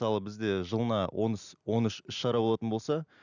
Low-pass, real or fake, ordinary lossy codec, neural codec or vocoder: 7.2 kHz; real; none; none